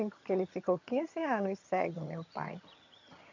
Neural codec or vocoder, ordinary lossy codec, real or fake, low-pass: vocoder, 22.05 kHz, 80 mel bands, HiFi-GAN; MP3, 48 kbps; fake; 7.2 kHz